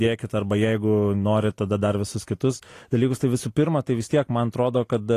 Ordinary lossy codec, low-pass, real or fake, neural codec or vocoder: AAC, 48 kbps; 14.4 kHz; real; none